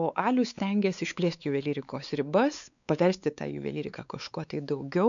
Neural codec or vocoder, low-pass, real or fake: codec, 16 kHz, 4 kbps, X-Codec, WavLM features, trained on Multilingual LibriSpeech; 7.2 kHz; fake